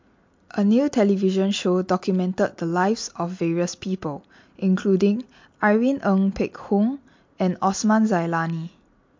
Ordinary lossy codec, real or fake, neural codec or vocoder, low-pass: MP3, 48 kbps; real; none; 7.2 kHz